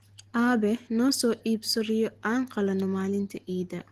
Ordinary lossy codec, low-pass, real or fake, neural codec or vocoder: Opus, 16 kbps; 14.4 kHz; real; none